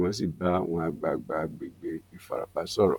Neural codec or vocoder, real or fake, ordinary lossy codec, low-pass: vocoder, 44.1 kHz, 128 mel bands, Pupu-Vocoder; fake; none; 19.8 kHz